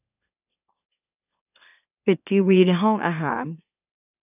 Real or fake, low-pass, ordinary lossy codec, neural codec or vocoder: fake; 3.6 kHz; none; autoencoder, 44.1 kHz, a latent of 192 numbers a frame, MeloTTS